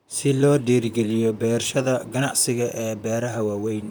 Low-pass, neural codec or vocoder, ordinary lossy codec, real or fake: none; vocoder, 44.1 kHz, 128 mel bands, Pupu-Vocoder; none; fake